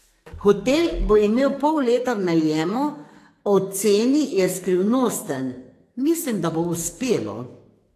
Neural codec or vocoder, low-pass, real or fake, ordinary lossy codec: codec, 44.1 kHz, 2.6 kbps, SNAC; 14.4 kHz; fake; AAC, 64 kbps